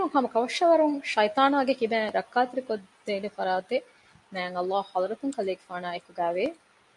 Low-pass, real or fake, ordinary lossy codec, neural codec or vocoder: 10.8 kHz; real; MP3, 64 kbps; none